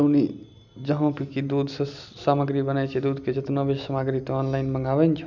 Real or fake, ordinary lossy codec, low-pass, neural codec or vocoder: real; none; 7.2 kHz; none